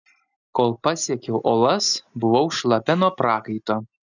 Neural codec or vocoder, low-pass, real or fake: none; 7.2 kHz; real